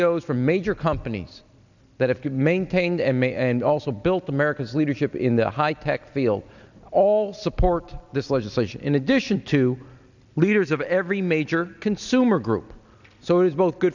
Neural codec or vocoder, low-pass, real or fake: none; 7.2 kHz; real